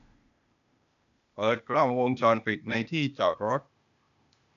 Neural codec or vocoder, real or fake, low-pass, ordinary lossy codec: codec, 16 kHz, 0.8 kbps, ZipCodec; fake; 7.2 kHz; none